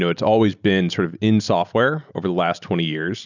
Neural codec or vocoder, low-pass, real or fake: none; 7.2 kHz; real